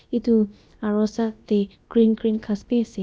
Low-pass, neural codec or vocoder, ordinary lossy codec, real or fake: none; codec, 16 kHz, about 1 kbps, DyCAST, with the encoder's durations; none; fake